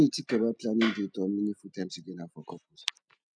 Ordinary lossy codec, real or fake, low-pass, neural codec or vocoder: none; real; 9.9 kHz; none